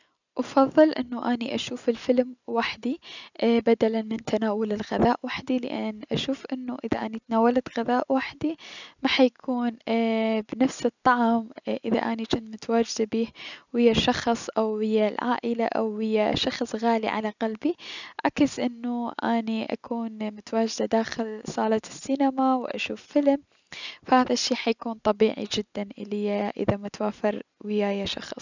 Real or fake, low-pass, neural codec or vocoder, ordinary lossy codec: real; 7.2 kHz; none; none